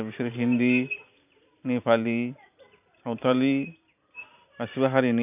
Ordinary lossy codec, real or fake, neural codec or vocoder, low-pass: none; fake; vocoder, 44.1 kHz, 128 mel bands every 512 samples, BigVGAN v2; 3.6 kHz